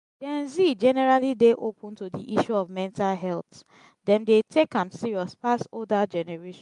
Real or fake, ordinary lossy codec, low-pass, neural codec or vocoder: real; MP3, 64 kbps; 10.8 kHz; none